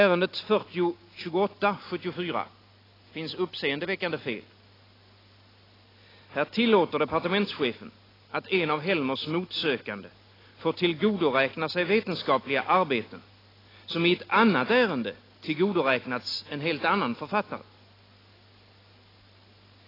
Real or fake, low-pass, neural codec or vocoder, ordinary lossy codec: real; 5.4 kHz; none; AAC, 24 kbps